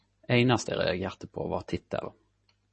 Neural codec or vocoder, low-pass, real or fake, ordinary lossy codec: none; 10.8 kHz; real; MP3, 32 kbps